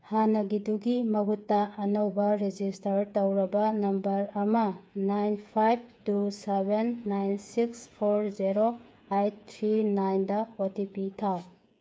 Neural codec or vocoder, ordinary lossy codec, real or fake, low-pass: codec, 16 kHz, 8 kbps, FreqCodec, smaller model; none; fake; none